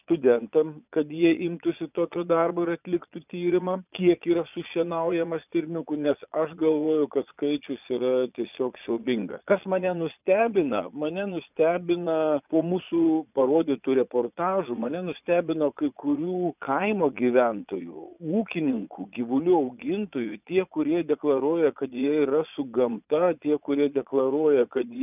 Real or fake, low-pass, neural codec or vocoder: fake; 3.6 kHz; vocoder, 22.05 kHz, 80 mel bands, Vocos